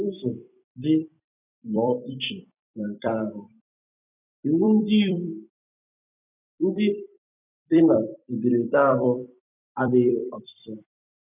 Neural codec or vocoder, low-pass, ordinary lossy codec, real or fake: vocoder, 44.1 kHz, 128 mel bands every 256 samples, BigVGAN v2; 3.6 kHz; none; fake